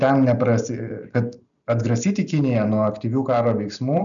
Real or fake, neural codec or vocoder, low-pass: real; none; 7.2 kHz